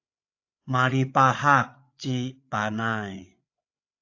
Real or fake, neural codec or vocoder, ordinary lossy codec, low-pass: fake; codec, 16 kHz, 8 kbps, FreqCodec, larger model; AAC, 48 kbps; 7.2 kHz